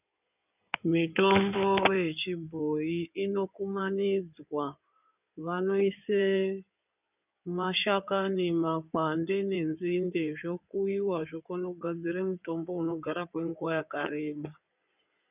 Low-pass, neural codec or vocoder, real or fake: 3.6 kHz; codec, 16 kHz in and 24 kHz out, 2.2 kbps, FireRedTTS-2 codec; fake